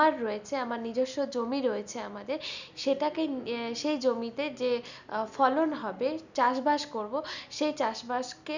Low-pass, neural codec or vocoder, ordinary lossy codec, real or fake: 7.2 kHz; none; none; real